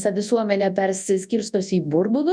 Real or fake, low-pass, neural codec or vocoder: fake; 9.9 kHz; codec, 24 kHz, 0.9 kbps, WavTokenizer, large speech release